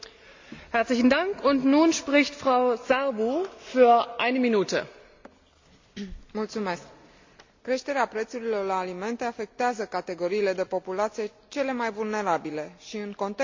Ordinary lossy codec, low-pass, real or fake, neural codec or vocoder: MP3, 64 kbps; 7.2 kHz; real; none